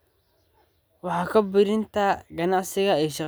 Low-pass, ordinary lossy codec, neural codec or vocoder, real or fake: none; none; none; real